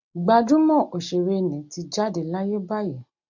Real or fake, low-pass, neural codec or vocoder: real; 7.2 kHz; none